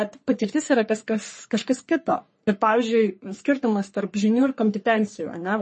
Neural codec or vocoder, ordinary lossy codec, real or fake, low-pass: codec, 44.1 kHz, 3.4 kbps, Pupu-Codec; MP3, 32 kbps; fake; 10.8 kHz